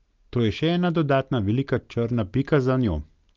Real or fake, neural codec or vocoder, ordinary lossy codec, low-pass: real; none; Opus, 24 kbps; 7.2 kHz